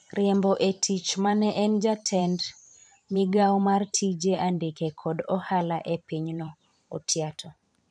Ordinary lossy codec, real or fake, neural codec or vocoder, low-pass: none; fake; vocoder, 44.1 kHz, 128 mel bands every 512 samples, BigVGAN v2; 9.9 kHz